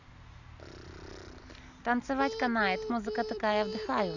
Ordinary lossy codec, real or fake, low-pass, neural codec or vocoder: none; real; 7.2 kHz; none